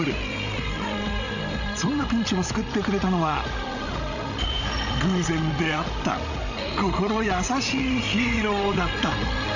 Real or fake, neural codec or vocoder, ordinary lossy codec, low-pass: fake; codec, 16 kHz, 16 kbps, FreqCodec, larger model; none; 7.2 kHz